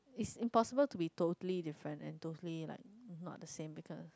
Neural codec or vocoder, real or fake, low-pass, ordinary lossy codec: none; real; none; none